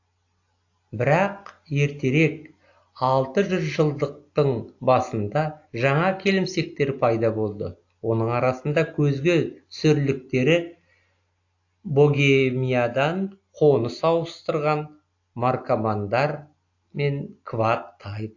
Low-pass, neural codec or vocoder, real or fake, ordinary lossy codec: 7.2 kHz; none; real; AAC, 48 kbps